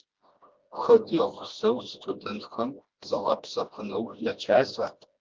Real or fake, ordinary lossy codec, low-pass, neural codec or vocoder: fake; Opus, 32 kbps; 7.2 kHz; codec, 16 kHz, 1 kbps, FreqCodec, smaller model